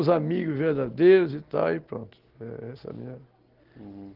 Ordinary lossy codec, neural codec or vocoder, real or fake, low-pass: Opus, 16 kbps; none; real; 5.4 kHz